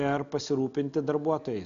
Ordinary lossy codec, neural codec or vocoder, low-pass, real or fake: MP3, 96 kbps; none; 7.2 kHz; real